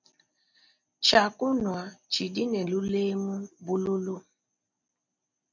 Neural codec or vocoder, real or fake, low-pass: none; real; 7.2 kHz